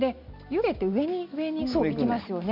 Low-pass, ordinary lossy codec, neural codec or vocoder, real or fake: 5.4 kHz; MP3, 48 kbps; none; real